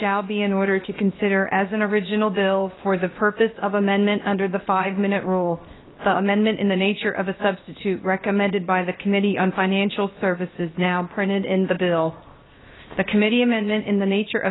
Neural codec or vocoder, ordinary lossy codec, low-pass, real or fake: codec, 16 kHz, 0.7 kbps, FocalCodec; AAC, 16 kbps; 7.2 kHz; fake